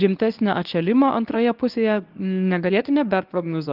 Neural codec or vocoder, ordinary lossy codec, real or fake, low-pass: codec, 24 kHz, 0.9 kbps, WavTokenizer, medium speech release version 1; Opus, 32 kbps; fake; 5.4 kHz